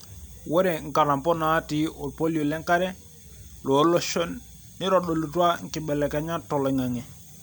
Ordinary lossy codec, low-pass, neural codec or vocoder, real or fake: none; none; none; real